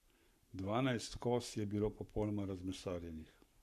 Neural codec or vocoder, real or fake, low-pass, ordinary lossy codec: codec, 44.1 kHz, 7.8 kbps, Pupu-Codec; fake; 14.4 kHz; MP3, 96 kbps